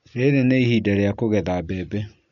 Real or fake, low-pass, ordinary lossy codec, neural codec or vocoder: real; 7.2 kHz; none; none